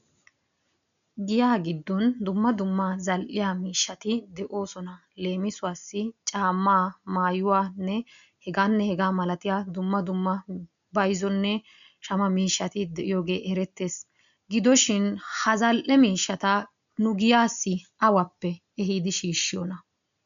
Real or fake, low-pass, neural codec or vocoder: real; 7.2 kHz; none